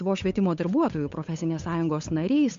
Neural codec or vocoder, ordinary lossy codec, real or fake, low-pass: codec, 16 kHz, 8 kbps, FunCodec, trained on Chinese and English, 25 frames a second; MP3, 48 kbps; fake; 7.2 kHz